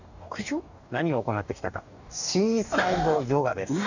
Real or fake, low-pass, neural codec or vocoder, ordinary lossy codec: fake; 7.2 kHz; codec, 44.1 kHz, 2.6 kbps, DAC; none